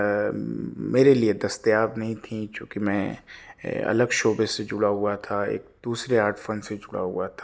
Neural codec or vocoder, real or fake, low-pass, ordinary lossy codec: none; real; none; none